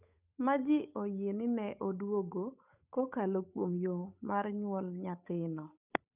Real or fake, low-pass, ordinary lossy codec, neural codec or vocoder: fake; 3.6 kHz; none; codec, 16 kHz, 8 kbps, FunCodec, trained on Chinese and English, 25 frames a second